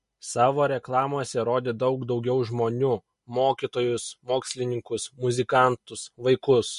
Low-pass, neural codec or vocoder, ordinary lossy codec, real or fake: 14.4 kHz; none; MP3, 48 kbps; real